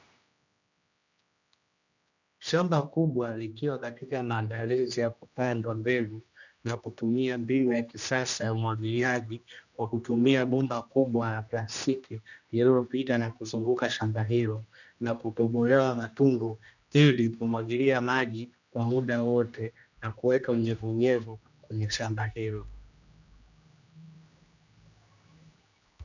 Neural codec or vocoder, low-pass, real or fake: codec, 16 kHz, 1 kbps, X-Codec, HuBERT features, trained on general audio; 7.2 kHz; fake